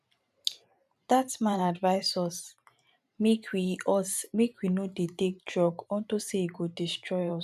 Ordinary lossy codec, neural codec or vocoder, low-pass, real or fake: none; vocoder, 44.1 kHz, 128 mel bands every 512 samples, BigVGAN v2; 14.4 kHz; fake